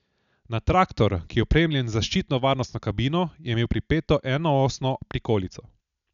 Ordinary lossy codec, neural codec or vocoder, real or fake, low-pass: none; none; real; 7.2 kHz